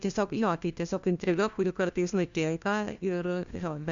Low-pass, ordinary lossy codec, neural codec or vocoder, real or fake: 7.2 kHz; Opus, 64 kbps; codec, 16 kHz, 1 kbps, FunCodec, trained on LibriTTS, 50 frames a second; fake